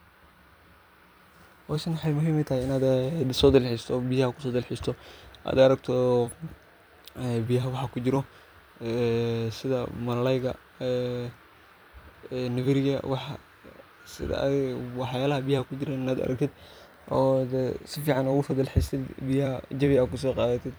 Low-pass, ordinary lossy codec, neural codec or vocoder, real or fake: none; none; vocoder, 44.1 kHz, 128 mel bands every 256 samples, BigVGAN v2; fake